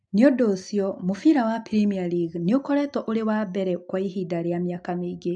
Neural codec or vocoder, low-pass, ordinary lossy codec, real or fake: none; 9.9 kHz; AAC, 64 kbps; real